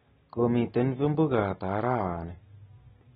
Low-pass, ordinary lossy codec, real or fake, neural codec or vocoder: 9.9 kHz; AAC, 16 kbps; real; none